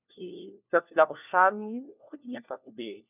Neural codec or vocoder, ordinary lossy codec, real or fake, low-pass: codec, 16 kHz, 1 kbps, FunCodec, trained on LibriTTS, 50 frames a second; none; fake; 3.6 kHz